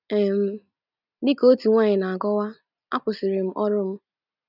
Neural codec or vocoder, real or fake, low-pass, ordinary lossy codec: none; real; 5.4 kHz; none